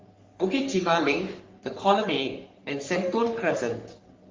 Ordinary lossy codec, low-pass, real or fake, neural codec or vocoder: Opus, 32 kbps; 7.2 kHz; fake; codec, 44.1 kHz, 3.4 kbps, Pupu-Codec